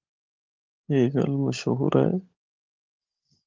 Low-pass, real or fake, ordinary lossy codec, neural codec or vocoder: 7.2 kHz; fake; Opus, 24 kbps; codec, 16 kHz, 16 kbps, FunCodec, trained on LibriTTS, 50 frames a second